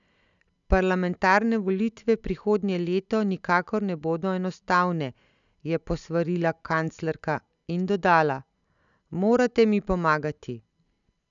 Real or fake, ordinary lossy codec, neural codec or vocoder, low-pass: real; none; none; 7.2 kHz